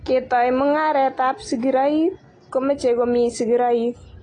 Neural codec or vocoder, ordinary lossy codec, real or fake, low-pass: none; AAC, 32 kbps; real; 9.9 kHz